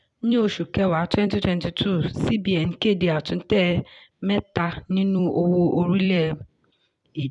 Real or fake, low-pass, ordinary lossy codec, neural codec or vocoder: fake; 10.8 kHz; none; vocoder, 44.1 kHz, 128 mel bands every 256 samples, BigVGAN v2